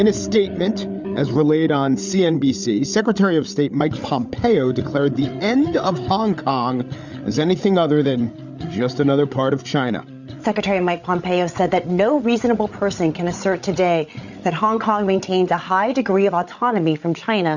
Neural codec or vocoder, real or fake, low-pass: codec, 16 kHz, 8 kbps, FreqCodec, larger model; fake; 7.2 kHz